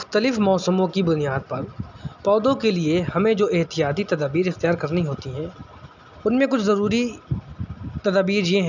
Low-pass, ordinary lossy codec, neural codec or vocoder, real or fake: 7.2 kHz; none; none; real